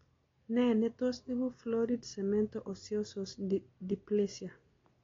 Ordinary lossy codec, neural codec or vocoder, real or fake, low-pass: AAC, 32 kbps; none; real; 7.2 kHz